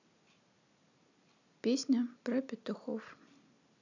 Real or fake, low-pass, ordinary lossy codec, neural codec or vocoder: real; 7.2 kHz; none; none